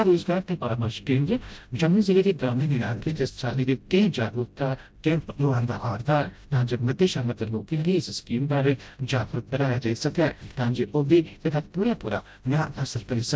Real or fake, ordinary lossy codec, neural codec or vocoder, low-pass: fake; none; codec, 16 kHz, 0.5 kbps, FreqCodec, smaller model; none